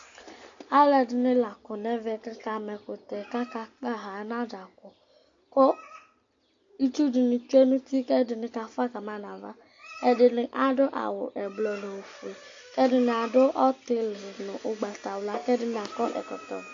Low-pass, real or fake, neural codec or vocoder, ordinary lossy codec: 7.2 kHz; real; none; AAC, 48 kbps